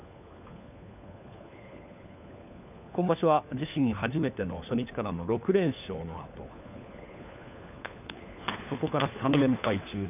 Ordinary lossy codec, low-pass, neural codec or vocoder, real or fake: none; 3.6 kHz; codec, 16 kHz, 4 kbps, FunCodec, trained on LibriTTS, 50 frames a second; fake